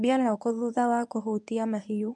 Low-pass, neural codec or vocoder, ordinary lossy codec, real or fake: none; codec, 24 kHz, 0.9 kbps, WavTokenizer, medium speech release version 1; none; fake